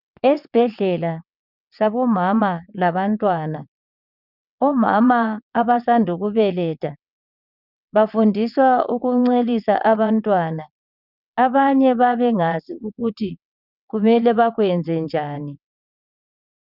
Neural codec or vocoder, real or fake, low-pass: vocoder, 22.05 kHz, 80 mel bands, WaveNeXt; fake; 5.4 kHz